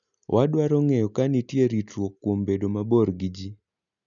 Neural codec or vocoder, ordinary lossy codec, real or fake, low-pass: none; none; real; 7.2 kHz